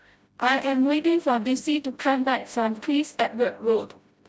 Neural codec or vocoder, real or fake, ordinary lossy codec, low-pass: codec, 16 kHz, 0.5 kbps, FreqCodec, smaller model; fake; none; none